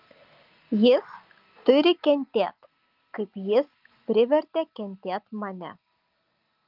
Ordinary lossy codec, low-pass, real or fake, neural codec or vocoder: Opus, 24 kbps; 5.4 kHz; real; none